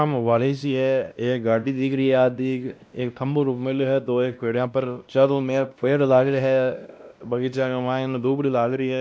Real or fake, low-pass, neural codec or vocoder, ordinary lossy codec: fake; none; codec, 16 kHz, 1 kbps, X-Codec, WavLM features, trained on Multilingual LibriSpeech; none